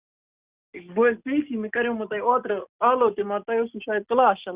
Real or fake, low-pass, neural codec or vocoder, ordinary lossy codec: real; 3.6 kHz; none; Opus, 64 kbps